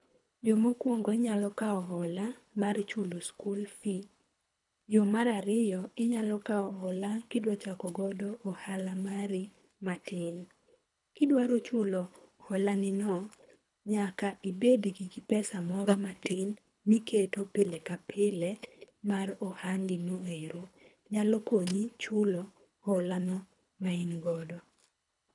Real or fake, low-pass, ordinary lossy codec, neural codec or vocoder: fake; 10.8 kHz; none; codec, 24 kHz, 3 kbps, HILCodec